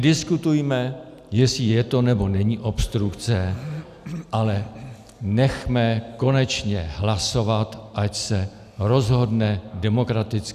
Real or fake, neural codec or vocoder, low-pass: real; none; 14.4 kHz